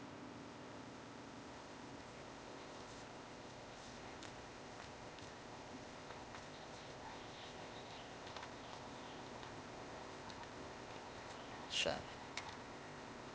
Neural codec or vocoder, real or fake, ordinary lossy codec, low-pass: codec, 16 kHz, 0.8 kbps, ZipCodec; fake; none; none